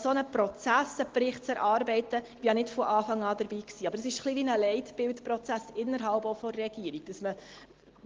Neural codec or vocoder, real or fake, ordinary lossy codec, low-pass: none; real; Opus, 16 kbps; 7.2 kHz